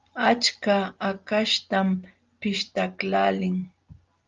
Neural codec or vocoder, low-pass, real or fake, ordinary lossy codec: none; 7.2 kHz; real; Opus, 32 kbps